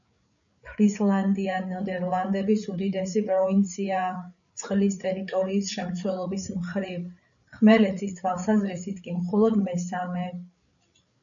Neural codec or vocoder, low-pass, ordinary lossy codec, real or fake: codec, 16 kHz, 8 kbps, FreqCodec, larger model; 7.2 kHz; MP3, 96 kbps; fake